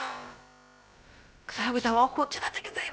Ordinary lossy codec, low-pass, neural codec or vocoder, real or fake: none; none; codec, 16 kHz, about 1 kbps, DyCAST, with the encoder's durations; fake